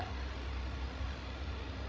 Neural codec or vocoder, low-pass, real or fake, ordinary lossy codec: codec, 16 kHz, 16 kbps, FreqCodec, larger model; none; fake; none